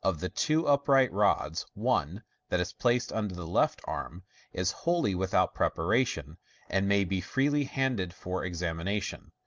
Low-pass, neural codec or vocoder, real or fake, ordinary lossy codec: 7.2 kHz; none; real; Opus, 24 kbps